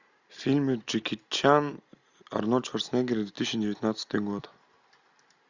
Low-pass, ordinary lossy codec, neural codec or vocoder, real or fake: 7.2 kHz; Opus, 64 kbps; none; real